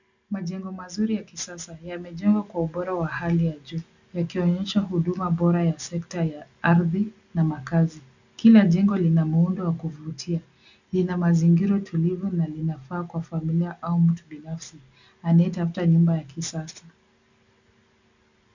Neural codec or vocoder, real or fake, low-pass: none; real; 7.2 kHz